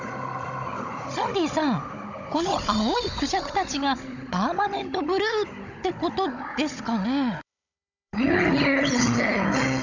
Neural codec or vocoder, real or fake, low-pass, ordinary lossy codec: codec, 16 kHz, 16 kbps, FunCodec, trained on Chinese and English, 50 frames a second; fake; 7.2 kHz; none